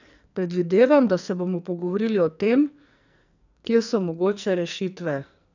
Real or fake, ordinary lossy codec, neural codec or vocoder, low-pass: fake; none; codec, 44.1 kHz, 2.6 kbps, SNAC; 7.2 kHz